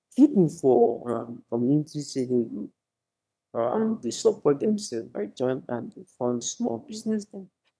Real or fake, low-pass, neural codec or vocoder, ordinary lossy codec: fake; none; autoencoder, 22.05 kHz, a latent of 192 numbers a frame, VITS, trained on one speaker; none